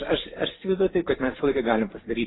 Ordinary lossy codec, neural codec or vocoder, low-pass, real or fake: AAC, 16 kbps; vocoder, 44.1 kHz, 128 mel bands every 512 samples, BigVGAN v2; 7.2 kHz; fake